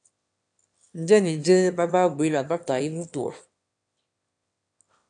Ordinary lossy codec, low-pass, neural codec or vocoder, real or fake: AAC, 64 kbps; 9.9 kHz; autoencoder, 22.05 kHz, a latent of 192 numbers a frame, VITS, trained on one speaker; fake